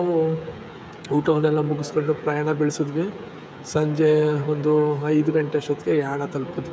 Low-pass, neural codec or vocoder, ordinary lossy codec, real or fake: none; codec, 16 kHz, 16 kbps, FreqCodec, smaller model; none; fake